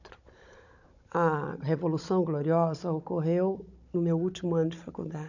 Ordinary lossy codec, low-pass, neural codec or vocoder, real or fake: none; 7.2 kHz; codec, 16 kHz, 16 kbps, FunCodec, trained on Chinese and English, 50 frames a second; fake